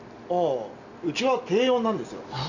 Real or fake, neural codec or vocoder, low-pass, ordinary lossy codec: real; none; 7.2 kHz; none